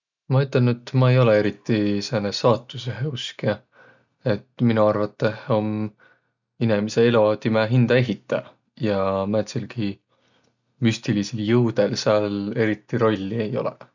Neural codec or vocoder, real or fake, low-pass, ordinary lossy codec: none; real; 7.2 kHz; none